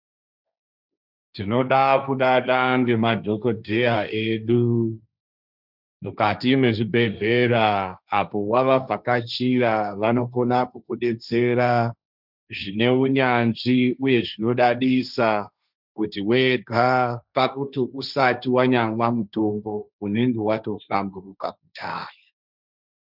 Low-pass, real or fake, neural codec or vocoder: 5.4 kHz; fake; codec, 16 kHz, 1.1 kbps, Voila-Tokenizer